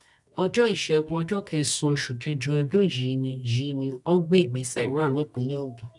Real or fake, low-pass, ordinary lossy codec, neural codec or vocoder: fake; 10.8 kHz; none; codec, 24 kHz, 0.9 kbps, WavTokenizer, medium music audio release